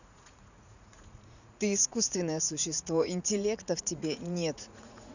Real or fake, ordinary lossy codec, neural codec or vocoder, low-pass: fake; none; vocoder, 44.1 kHz, 80 mel bands, Vocos; 7.2 kHz